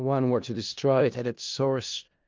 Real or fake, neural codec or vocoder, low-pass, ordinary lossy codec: fake; codec, 16 kHz in and 24 kHz out, 0.4 kbps, LongCat-Audio-Codec, four codebook decoder; 7.2 kHz; Opus, 24 kbps